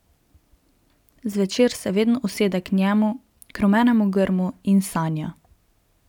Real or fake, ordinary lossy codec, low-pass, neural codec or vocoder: real; none; 19.8 kHz; none